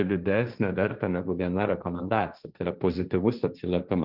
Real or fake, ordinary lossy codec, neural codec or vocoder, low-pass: fake; Opus, 24 kbps; codec, 16 kHz, 1.1 kbps, Voila-Tokenizer; 5.4 kHz